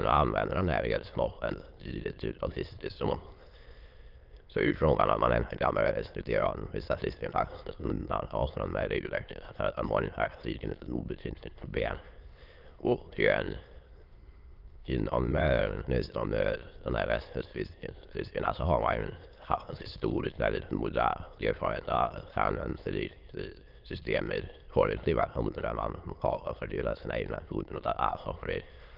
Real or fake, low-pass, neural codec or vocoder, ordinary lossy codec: fake; 5.4 kHz; autoencoder, 22.05 kHz, a latent of 192 numbers a frame, VITS, trained on many speakers; Opus, 32 kbps